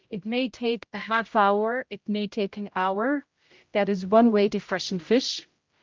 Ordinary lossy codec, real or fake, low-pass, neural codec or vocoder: Opus, 24 kbps; fake; 7.2 kHz; codec, 16 kHz, 0.5 kbps, X-Codec, HuBERT features, trained on general audio